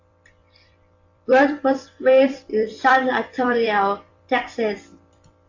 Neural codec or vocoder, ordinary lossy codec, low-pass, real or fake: vocoder, 44.1 kHz, 128 mel bands every 256 samples, BigVGAN v2; AAC, 48 kbps; 7.2 kHz; fake